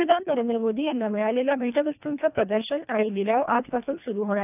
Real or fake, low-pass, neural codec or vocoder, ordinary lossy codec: fake; 3.6 kHz; codec, 24 kHz, 1.5 kbps, HILCodec; none